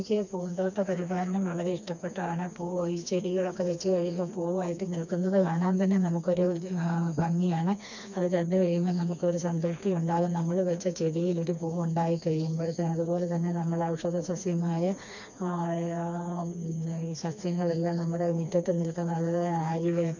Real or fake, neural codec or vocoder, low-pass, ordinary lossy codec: fake; codec, 16 kHz, 2 kbps, FreqCodec, smaller model; 7.2 kHz; none